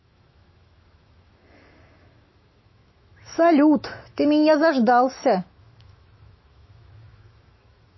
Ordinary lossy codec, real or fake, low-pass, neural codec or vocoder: MP3, 24 kbps; fake; 7.2 kHz; autoencoder, 48 kHz, 128 numbers a frame, DAC-VAE, trained on Japanese speech